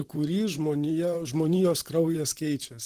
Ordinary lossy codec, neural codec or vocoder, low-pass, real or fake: Opus, 16 kbps; vocoder, 44.1 kHz, 128 mel bands, Pupu-Vocoder; 14.4 kHz; fake